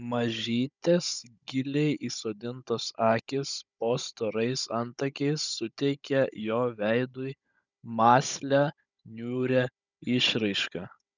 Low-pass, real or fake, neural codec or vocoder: 7.2 kHz; fake; codec, 16 kHz, 16 kbps, FunCodec, trained on Chinese and English, 50 frames a second